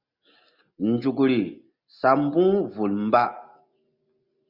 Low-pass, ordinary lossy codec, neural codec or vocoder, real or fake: 5.4 kHz; Opus, 64 kbps; none; real